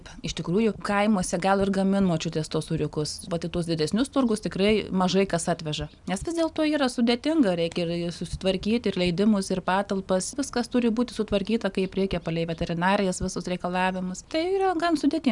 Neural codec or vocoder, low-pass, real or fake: none; 10.8 kHz; real